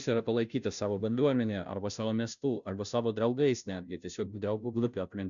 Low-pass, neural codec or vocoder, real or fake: 7.2 kHz; codec, 16 kHz, 0.5 kbps, FunCodec, trained on Chinese and English, 25 frames a second; fake